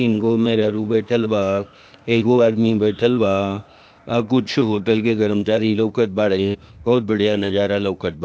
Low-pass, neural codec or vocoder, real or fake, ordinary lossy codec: none; codec, 16 kHz, 0.8 kbps, ZipCodec; fake; none